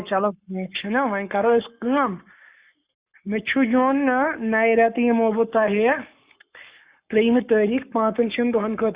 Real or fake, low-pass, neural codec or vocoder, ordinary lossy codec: fake; 3.6 kHz; codec, 44.1 kHz, 7.8 kbps, Pupu-Codec; Opus, 64 kbps